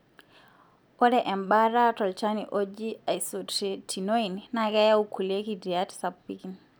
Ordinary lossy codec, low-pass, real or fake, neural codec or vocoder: none; none; real; none